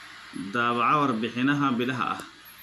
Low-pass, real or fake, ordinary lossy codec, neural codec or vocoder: 14.4 kHz; real; none; none